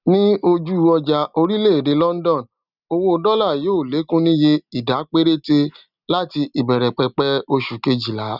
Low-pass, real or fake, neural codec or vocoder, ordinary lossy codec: 5.4 kHz; real; none; none